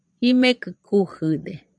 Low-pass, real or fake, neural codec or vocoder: 9.9 kHz; fake; vocoder, 22.05 kHz, 80 mel bands, Vocos